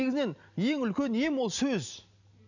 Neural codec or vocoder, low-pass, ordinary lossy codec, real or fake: none; 7.2 kHz; none; real